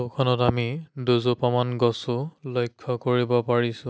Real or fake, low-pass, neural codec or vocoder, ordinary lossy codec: real; none; none; none